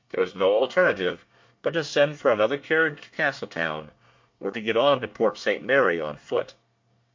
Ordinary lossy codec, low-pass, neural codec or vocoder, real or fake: MP3, 48 kbps; 7.2 kHz; codec, 24 kHz, 1 kbps, SNAC; fake